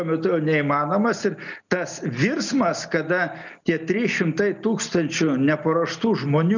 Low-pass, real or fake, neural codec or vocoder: 7.2 kHz; real; none